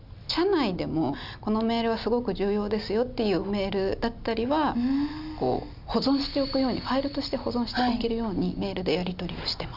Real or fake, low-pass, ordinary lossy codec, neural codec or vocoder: real; 5.4 kHz; none; none